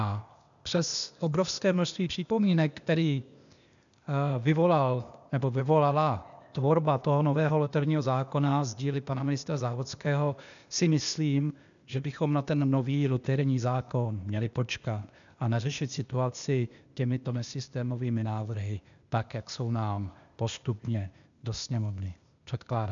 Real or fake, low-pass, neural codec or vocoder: fake; 7.2 kHz; codec, 16 kHz, 0.8 kbps, ZipCodec